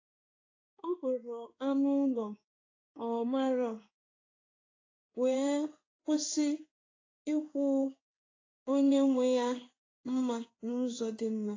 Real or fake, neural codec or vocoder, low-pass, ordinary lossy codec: fake; codec, 16 kHz in and 24 kHz out, 1 kbps, XY-Tokenizer; 7.2 kHz; AAC, 32 kbps